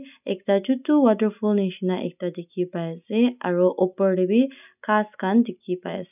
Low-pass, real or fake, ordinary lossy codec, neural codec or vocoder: 3.6 kHz; real; none; none